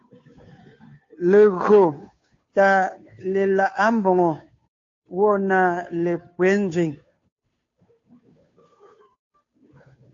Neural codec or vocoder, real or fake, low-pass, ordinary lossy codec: codec, 16 kHz, 2 kbps, FunCodec, trained on Chinese and English, 25 frames a second; fake; 7.2 kHz; AAC, 48 kbps